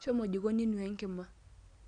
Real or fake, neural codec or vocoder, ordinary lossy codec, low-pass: real; none; none; 9.9 kHz